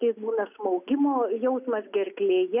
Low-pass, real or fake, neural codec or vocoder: 3.6 kHz; real; none